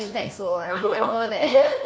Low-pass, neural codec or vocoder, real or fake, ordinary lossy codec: none; codec, 16 kHz, 1 kbps, FunCodec, trained on LibriTTS, 50 frames a second; fake; none